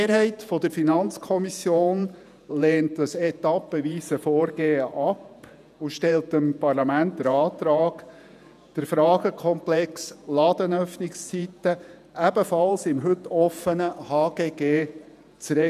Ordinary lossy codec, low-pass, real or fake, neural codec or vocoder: none; 14.4 kHz; fake; vocoder, 48 kHz, 128 mel bands, Vocos